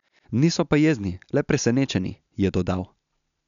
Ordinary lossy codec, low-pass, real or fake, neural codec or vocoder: none; 7.2 kHz; real; none